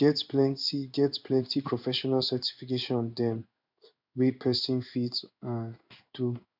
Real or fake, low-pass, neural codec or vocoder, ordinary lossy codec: fake; 5.4 kHz; codec, 16 kHz in and 24 kHz out, 1 kbps, XY-Tokenizer; none